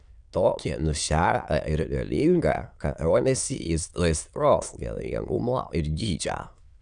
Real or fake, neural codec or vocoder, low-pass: fake; autoencoder, 22.05 kHz, a latent of 192 numbers a frame, VITS, trained on many speakers; 9.9 kHz